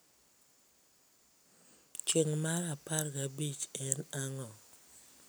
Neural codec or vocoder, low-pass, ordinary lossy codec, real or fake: none; none; none; real